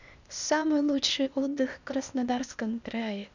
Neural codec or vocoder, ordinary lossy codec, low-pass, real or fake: codec, 16 kHz, 0.8 kbps, ZipCodec; none; 7.2 kHz; fake